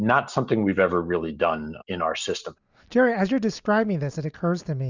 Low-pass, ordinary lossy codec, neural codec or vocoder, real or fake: 7.2 kHz; Opus, 64 kbps; none; real